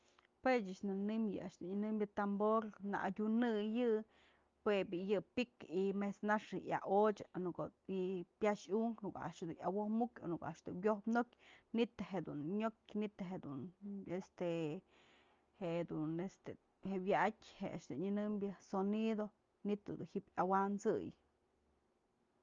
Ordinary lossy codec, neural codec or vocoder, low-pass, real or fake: Opus, 32 kbps; none; 7.2 kHz; real